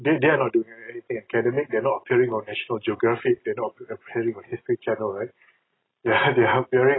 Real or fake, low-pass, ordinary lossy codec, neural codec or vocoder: real; 7.2 kHz; AAC, 16 kbps; none